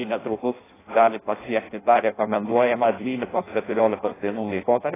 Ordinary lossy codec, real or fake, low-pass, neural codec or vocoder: AAC, 16 kbps; fake; 3.6 kHz; codec, 16 kHz in and 24 kHz out, 0.6 kbps, FireRedTTS-2 codec